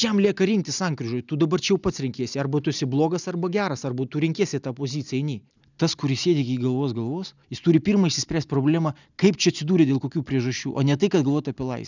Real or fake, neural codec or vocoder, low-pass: real; none; 7.2 kHz